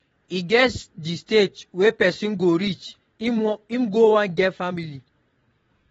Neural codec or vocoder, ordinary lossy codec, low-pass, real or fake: vocoder, 22.05 kHz, 80 mel bands, WaveNeXt; AAC, 24 kbps; 9.9 kHz; fake